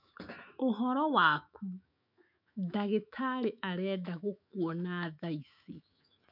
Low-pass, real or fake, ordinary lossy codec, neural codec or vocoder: 5.4 kHz; fake; none; autoencoder, 48 kHz, 128 numbers a frame, DAC-VAE, trained on Japanese speech